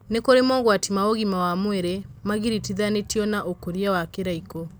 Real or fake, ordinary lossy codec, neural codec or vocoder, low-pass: real; none; none; none